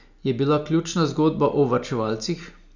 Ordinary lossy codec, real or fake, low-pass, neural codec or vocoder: none; real; 7.2 kHz; none